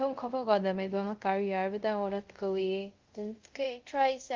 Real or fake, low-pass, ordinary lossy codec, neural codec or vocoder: fake; 7.2 kHz; Opus, 32 kbps; codec, 24 kHz, 0.5 kbps, DualCodec